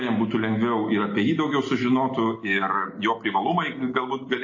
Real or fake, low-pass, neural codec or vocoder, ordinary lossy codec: real; 7.2 kHz; none; MP3, 32 kbps